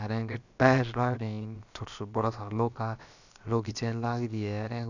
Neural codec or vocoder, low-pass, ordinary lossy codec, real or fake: codec, 16 kHz, about 1 kbps, DyCAST, with the encoder's durations; 7.2 kHz; none; fake